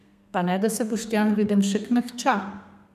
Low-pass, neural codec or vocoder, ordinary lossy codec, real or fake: 14.4 kHz; codec, 32 kHz, 1.9 kbps, SNAC; none; fake